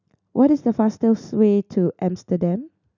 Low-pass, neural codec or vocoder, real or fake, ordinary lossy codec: 7.2 kHz; none; real; none